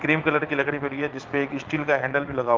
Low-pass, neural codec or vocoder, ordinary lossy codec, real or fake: 7.2 kHz; vocoder, 22.05 kHz, 80 mel bands, Vocos; Opus, 24 kbps; fake